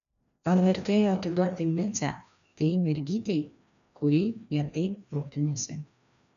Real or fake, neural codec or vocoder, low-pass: fake; codec, 16 kHz, 1 kbps, FreqCodec, larger model; 7.2 kHz